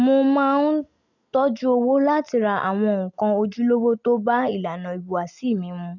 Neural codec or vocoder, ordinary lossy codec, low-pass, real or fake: none; none; 7.2 kHz; real